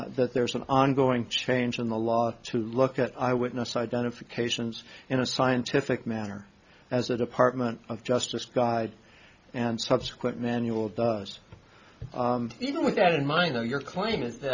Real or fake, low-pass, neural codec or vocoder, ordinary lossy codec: real; 7.2 kHz; none; Opus, 64 kbps